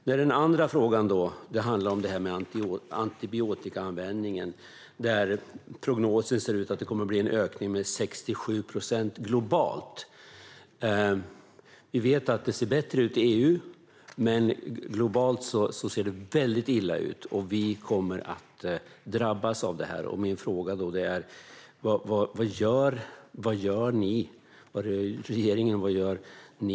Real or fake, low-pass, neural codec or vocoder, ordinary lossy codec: real; none; none; none